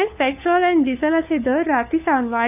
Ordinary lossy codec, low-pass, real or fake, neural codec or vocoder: none; 3.6 kHz; fake; codec, 16 kHz, 4 kbps, FunCodec, trained on LibriTTS, 50 frames a second